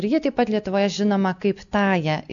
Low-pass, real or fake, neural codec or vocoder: 7.2 kHz; real; none